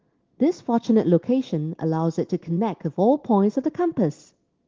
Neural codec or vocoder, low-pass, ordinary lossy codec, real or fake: none; 7.2 kHz; Opus, 16 kbps; real